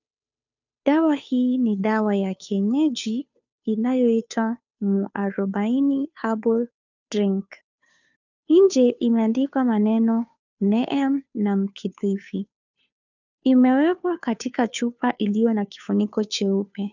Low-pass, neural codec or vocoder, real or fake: 7.2 kHz; codec, 16 kHz, 2 kbps, FunCodec, trained on Chinese and English, 25 frames a second; fake